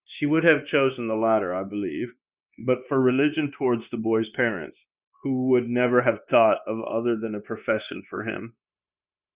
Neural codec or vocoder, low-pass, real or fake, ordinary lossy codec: codec, 16 kHz, 0.9 kbps, LongCat-Audio-Codec; 3.6 kHz; fake; Opus, 64 kbps